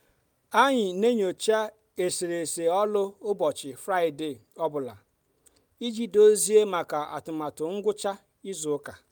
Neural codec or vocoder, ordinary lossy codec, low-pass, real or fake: none; none; none; real